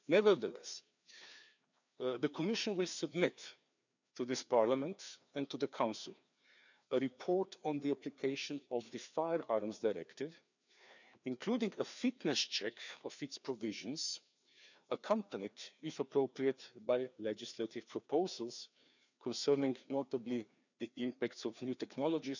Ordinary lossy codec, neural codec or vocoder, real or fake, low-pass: none; codec, 16 kHz, 2 kbps, FreqCodec, larger model; fake; 7.2 kHz